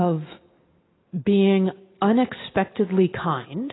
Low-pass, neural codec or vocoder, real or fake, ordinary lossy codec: 7.2 kHz; none; real; AAC, 16 kbps